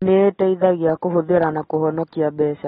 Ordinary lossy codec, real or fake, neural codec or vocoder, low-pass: AAC, 16 kbps; real; none; 19.8 kHz